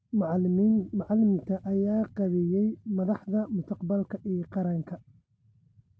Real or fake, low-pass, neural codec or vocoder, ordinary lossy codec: real; none; none; none